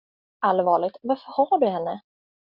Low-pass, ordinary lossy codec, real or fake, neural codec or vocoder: 5.4 kHz; Opus, 64 kbps; real; none